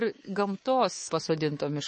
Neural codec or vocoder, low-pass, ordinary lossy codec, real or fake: codec, 24 kHz, 3.1 kbps, DualCodec; 10.8 kHz; MP3, 32 kbps; fake